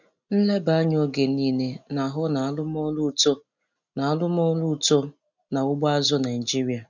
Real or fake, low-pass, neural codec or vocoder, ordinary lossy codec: real; 7.2 kHz; none; none